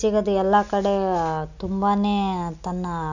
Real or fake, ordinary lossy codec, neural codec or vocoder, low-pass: real; none; none; 7.2 kHz